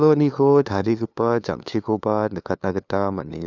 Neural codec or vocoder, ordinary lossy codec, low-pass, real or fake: codec, 16 kHz, 2 kbps, FunCodec, trained on LibriTTS, 25 frames a second; none; 7.2 kHz; fake